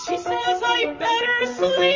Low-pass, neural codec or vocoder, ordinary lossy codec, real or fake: 7.2 kHz; vocoder, 44.1 kHz, 128 mel bands, Pupu-Vocoder; MP3, 32 kbps; fake